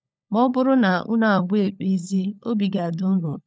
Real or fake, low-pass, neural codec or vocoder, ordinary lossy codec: fake; none; codec, 16 kHz, 16 kbps, FunCodec, trained on LibriTTS, 50 frames a second; none